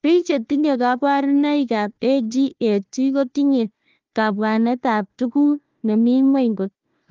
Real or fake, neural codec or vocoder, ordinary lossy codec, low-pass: fake; codec, 16 kHz, 1 kbps, FunCodec, trained on Chinese and English, 50 frames a second; Opus, 32 kbps; 7.2 kHz